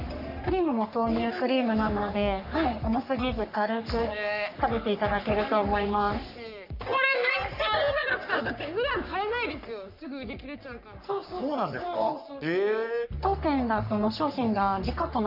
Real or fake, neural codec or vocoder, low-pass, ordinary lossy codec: fake; codec, 44.1 kHz, 3.4 kbps, Pupu-Codec; 5.4 kHz; none